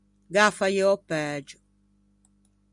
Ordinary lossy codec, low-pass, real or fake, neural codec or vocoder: MP3, 96 kbps; 10.8 kHz; real; none